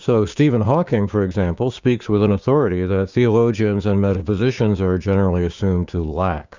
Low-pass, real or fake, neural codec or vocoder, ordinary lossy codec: 7.2 kHz; fake; codec, 16 kHz, 6 kbps, DAC; Opus, 64 kbps